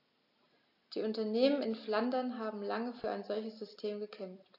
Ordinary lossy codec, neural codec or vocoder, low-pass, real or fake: AAC, 32 kbps; none; 5.4 kHz; real